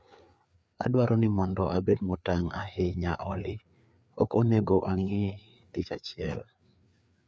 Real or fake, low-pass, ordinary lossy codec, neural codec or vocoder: fake; none; none; codec, 16 kHz, 4 kbps, FreqCodec, larger model